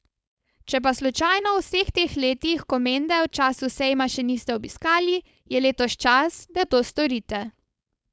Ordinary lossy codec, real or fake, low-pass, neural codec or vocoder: none; fake; none; codec, 16 kHz, 4.8 kbps, FACodec